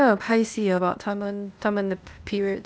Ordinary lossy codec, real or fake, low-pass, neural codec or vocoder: none; fake; none; codec, 16 kHz, 0.8 kbps, ZipCodec